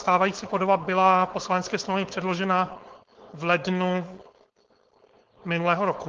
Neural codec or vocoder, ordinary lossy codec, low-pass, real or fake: codec, 16 kHz, 4.8 kbps, FACodec; Opus, 16 kbps; 7.2 kHz; fake